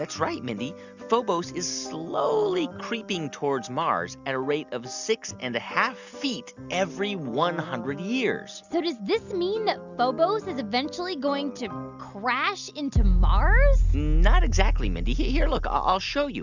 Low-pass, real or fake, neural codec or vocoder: 7.2 kHz; real; none